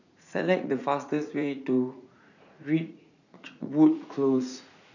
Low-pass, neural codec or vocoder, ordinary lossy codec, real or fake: 7.2 kHz; vocoder, 44.1 kHz, 80 mel bands, Vocos; none; fake